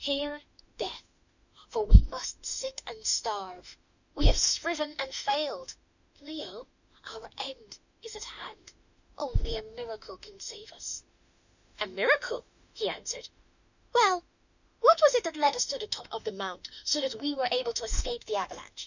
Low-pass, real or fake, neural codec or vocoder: 7.2 kHz; fake; autoencoder, 48 kHz, 32 numbers a frame, DAC-VAE, trained on Japanese speech